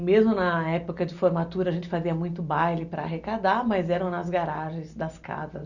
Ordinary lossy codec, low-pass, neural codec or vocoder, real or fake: none; 7.2 kHz; none; real